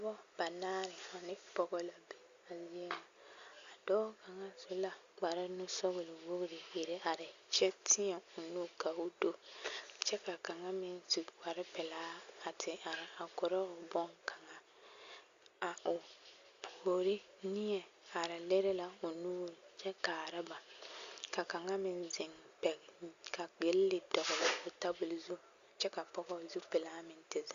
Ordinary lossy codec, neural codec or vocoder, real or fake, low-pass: Opus, 64 kbps; none; real; 7.2 kHz